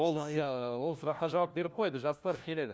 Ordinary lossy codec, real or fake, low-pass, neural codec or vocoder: none; fake; none; codec, 16 kHz, 1 kbps, FunCodec, trained on LibriTTS, 50 frames a second